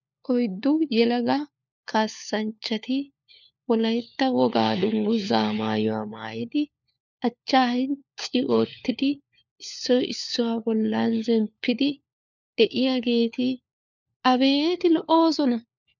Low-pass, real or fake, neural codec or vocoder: 7.2 kHz; fake; codec, 16 kHz, 4 kbps, FunCodec, trained on LibriTTS, 50 frames a second